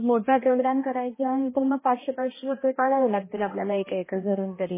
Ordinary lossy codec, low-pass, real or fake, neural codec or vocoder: MP3, 16 kbps; 3.6 kHz; fake; codec, 16 kHz, 1 kbps, X-Codec, HuBERT features, trained on balanced general audio